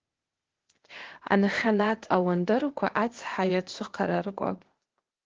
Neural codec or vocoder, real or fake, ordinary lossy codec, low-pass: codec, 16 kHz, 0.8 kbps, ZipCodec; fake; Opus, 16 kbps; 7.2 kHz